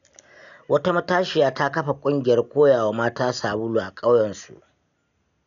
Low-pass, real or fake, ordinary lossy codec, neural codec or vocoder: 7.2 kHz; real; none; none